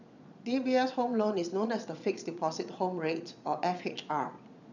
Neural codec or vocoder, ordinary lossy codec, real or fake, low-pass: vocoder, 22.05 kHz, 80 mel bands, Vocos; none; fake; 7.2 kHz